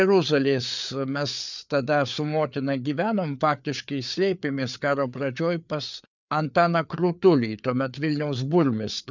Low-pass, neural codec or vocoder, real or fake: 7.2 kHz; codec, 16 kHz, 4 kbps, FreqCodec, larger model; fake